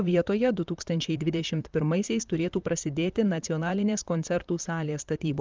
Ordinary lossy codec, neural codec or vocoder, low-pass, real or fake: Opus, 24 kbps; vocoder, 44.1 kHz, 128 mel bands, Pupu-Vocoder; 7.2 kHz; fake